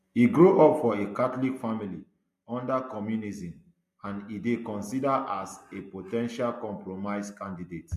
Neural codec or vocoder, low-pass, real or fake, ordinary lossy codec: none; 14.4 kHz; real; MP3, 64 kbps